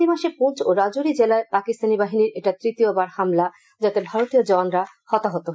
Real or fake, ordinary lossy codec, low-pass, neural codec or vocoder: real; none; none; none